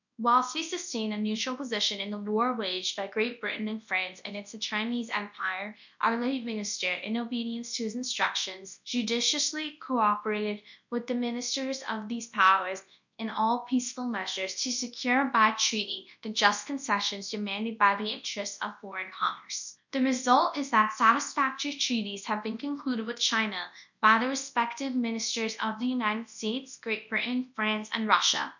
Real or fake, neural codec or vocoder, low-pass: fake; codec, 24 kHz, 0.9 kbps, WavTokenizer, large speech release; 7.2 kHz